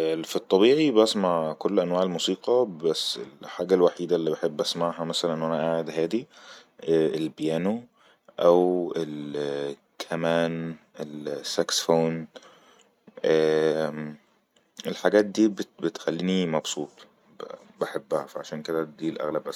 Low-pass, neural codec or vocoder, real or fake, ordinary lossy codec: 19.8 kHz; none; real; none